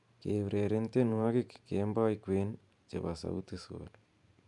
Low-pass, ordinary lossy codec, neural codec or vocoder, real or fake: 10.8 kHz; MP3, 96 kbps; vocoder, 44.1 kHz, 128 mel bands every 512 samples, BigVGAN v2; fake